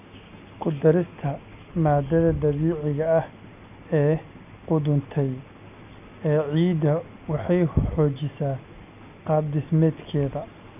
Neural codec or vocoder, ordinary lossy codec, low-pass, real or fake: none; none; 3.6 kHz; real